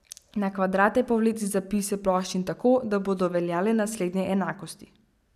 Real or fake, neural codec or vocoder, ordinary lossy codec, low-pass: real; none; none; 14.4 kHz